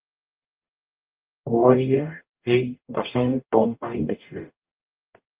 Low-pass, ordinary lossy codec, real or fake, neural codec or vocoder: 3.6 kHz; Opus, 32 kbps; fake; codec, 44.1 kHz, 0.9 kbps, DAC